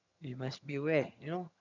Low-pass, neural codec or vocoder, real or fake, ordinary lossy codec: 7.2 kHz; vocoder, 22.05 kHz, 80 mel bands, HiFi-GAN; fake; none